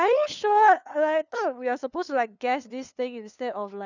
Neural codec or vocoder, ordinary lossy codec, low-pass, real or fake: codec, 16 kHz, 2 kbps, FunCodec, trained on LibriTTS, 25 frames a second; none; 7.2 kHz; fake